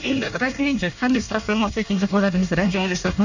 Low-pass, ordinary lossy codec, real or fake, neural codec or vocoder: 7.2 kHz; none; fake; codec, 24 kHz, 1 kbps, SNAC